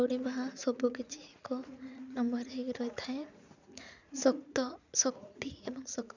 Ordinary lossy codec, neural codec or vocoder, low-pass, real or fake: none; vocoder, 44.1 kHz, 80 mel bands, Vocos; 7.2 kHz; fake